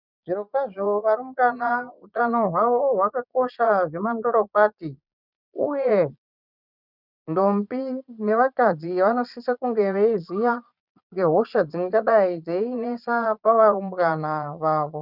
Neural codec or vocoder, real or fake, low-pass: vocoder, 22.05 kHz, 80 mel bands, Vocos; fake; 5.4 kHz